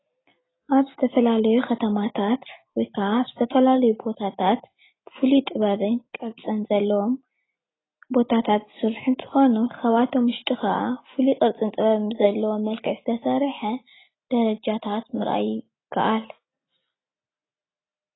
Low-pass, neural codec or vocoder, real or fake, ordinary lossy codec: 7.2 kHz; none; real; AAC, 16 kbps